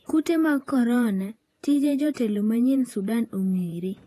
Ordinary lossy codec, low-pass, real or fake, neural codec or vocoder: AAC, 48 kbps; 14.4 kHz; fake; vocoder, 48 kHz, 128 mel bands, Vocos